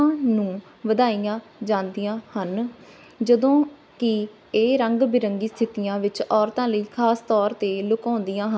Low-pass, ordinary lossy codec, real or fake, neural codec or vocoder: none; none; real; none